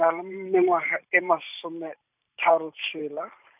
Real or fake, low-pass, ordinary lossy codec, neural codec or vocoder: fake; 3.6 kHz; none; vocoder, 44.1 kHz, 128 mel bands every 512 samples, BigVGAN v2